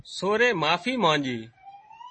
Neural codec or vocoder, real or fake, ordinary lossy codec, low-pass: none; real; MP3, 32 kbps; 9.9 kHz